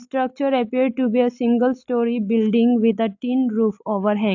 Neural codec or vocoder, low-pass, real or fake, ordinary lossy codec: none; 7.2 kHz; real; AAC, 48 kbps